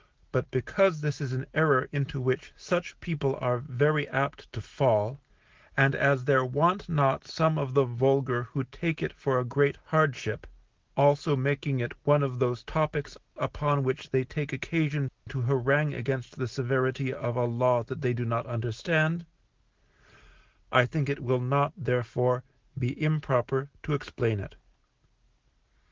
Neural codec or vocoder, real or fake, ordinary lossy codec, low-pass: none; real; Opus, 16 kbps; 7.2 kHz